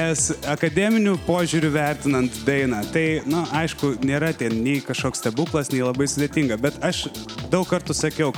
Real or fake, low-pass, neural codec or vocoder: real; 19.8 kHz; none